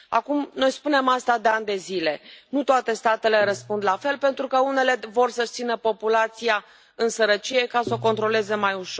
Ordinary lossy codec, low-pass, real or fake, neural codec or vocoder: none; none; real; none